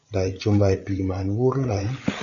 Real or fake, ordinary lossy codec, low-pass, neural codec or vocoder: fake; AAC, 48 kbps; 7.2 kHz; codec, 16 kHz, 8 kbps, FreqCodec, larger model